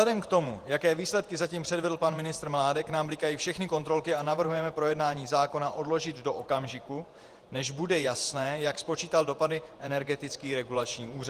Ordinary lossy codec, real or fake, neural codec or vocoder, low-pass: Opus, 24 kbps; fake; vocoder, 48 kHz, 128 mel bands, Vocos; 14.4 kHz